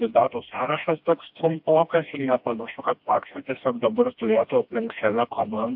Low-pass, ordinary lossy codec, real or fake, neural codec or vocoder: 5.4 kHz; AAC, 48 kbps; fake; codec, 16 kHz, 1 kbps, FreqCodec, smaller model